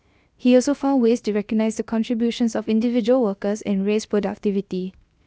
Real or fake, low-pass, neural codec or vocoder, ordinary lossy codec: fake; none; codec, 16 kHz, 0.7 kbps, FocalCodec; none